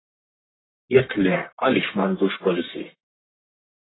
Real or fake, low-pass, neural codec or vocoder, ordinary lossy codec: fake; 7.2 kHz; codec, 44.1 kHz, 1.7 kbps, Pupu-Codec; AAC, 16 kbps